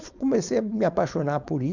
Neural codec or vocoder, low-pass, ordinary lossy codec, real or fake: codec, 16 kHz, 4.8 kbps, FACodec; 7.2 kHz; none; fake